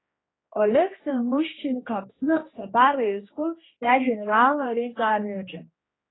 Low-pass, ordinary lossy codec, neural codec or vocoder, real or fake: 7.2 kHz; AAC, 16 kbps; codec, 16 kHz, 1 kbps, X-Codec, HuBERT features, trained on general audio; fake